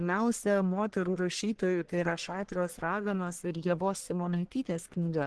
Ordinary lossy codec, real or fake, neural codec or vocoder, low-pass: Opus, 16 kbps; fake; codec, 44.1 kHz, 1.7 kbps, Pupu-Codec; 10.8 kHz